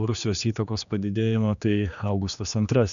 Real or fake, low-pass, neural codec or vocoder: fake; 7.2 kHz; codec, 16 kHz, 2 kbps, X-Codec, HuBERT features, trained on general audio